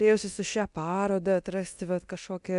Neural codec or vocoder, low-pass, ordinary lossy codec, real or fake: codec, 24 kHz, 0.9 kbps, DualCodec; 10.8 kHz; AAC, 96 kbps; fake